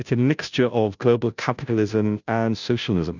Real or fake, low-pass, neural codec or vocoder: fake; 7.2 kHz; codec, 16 kHz, 0.5 kbps, FunCodec, trained on Chinese and English, 25 frames a second